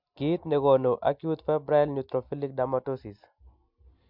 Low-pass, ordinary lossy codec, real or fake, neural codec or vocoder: 5.4 kHz; MP3, 48 kbps; real; none